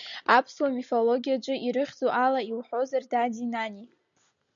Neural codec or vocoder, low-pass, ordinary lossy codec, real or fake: none; 7.2 kHz; MP3, 96 kbps; real